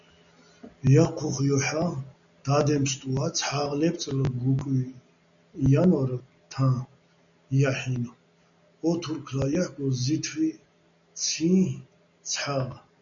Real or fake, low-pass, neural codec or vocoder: real; 7.2 kHz; none